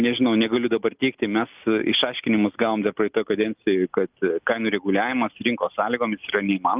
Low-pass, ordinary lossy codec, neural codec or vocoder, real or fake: 3.6 kHz; Opus, 24 kbps; none; real